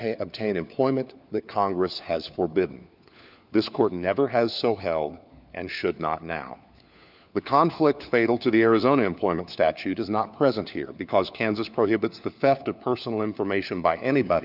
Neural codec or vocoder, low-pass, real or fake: codec, 16 kHz, 4 kbps, FunCodec, trained on LibriTTS, 50 frames a second; 5.4 kHz; fake